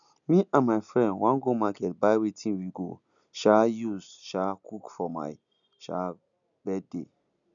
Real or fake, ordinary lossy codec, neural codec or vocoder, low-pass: real; none; none; 7.2 kHz